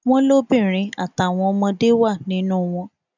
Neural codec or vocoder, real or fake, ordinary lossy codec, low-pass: none; real; none; 7.2 kHz